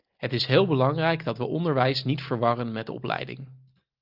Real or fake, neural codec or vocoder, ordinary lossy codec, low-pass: real; none; Opus, 32 kbps; 5.4 kHz